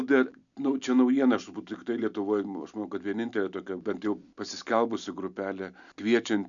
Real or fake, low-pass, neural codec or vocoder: real; 7.2 kHz; none